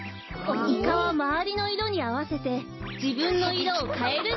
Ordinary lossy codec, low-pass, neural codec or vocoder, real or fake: MP3, 24 kbps; 7.2 kHz; none; real